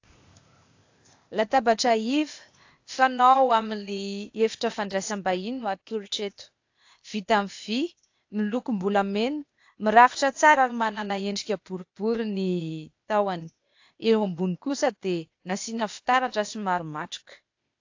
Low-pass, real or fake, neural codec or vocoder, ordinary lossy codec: 7.2 kHz; fake; codec, 16 kHz, 0.8 kbps, ZipCodec; AAC, 48 kbps